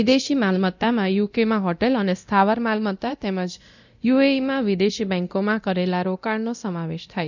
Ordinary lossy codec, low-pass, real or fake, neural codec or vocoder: none; 7.2 kHz; fake; codec, 24 kHz, 0.9 kbps, DualCodec